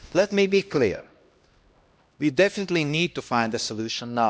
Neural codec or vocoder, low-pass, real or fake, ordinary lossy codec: codec, 16 kHz, 1 kbps, X-Codec, HuBERT features, trained on LibriSpeech; none; fake; none